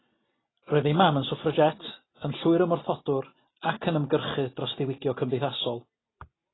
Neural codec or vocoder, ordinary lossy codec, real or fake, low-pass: none; AAC, 16 kbps; real; 7.2 kHz